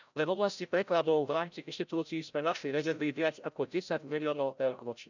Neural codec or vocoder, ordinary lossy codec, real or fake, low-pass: codec, 16 kHz, 0.5 kbps, FreqCodec, larger model; none; fake; 7.2 kHz